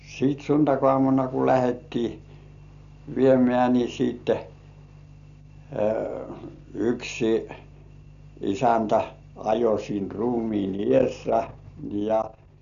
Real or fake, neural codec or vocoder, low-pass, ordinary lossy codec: real; none; 7.2 kHz; none